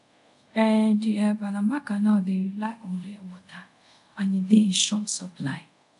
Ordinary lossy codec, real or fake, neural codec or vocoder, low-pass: none; fake; codec, 24 kHz, 0.5 kbps, DualCodec; 10.8 kHz